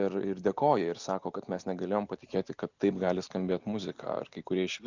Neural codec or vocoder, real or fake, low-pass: none; real; 7.2 kHz